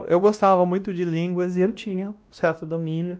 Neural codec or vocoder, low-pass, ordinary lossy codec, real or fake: codec, 16 kHz, 1 kbps, X-Codec, WavLM features, trained on Multilingual LibriSpeech; none; none; fake